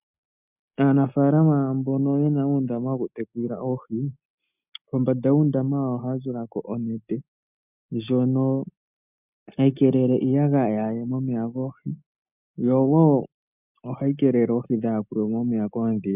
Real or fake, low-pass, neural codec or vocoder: real; 3.6 kHz; none